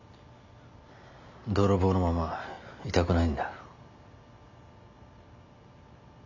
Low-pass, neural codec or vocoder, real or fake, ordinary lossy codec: 7.2 kHz; none; real; none